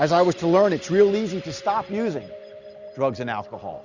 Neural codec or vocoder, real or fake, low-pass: none; real; 7.2 kHz